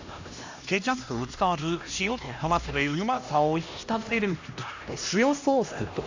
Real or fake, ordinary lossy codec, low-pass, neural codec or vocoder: fake; AAC, 48 kbps; 7.2 kHz; codec, 16 kHz, 1 kbps, X-Codec, HuBERT features, trained on LibriSpeech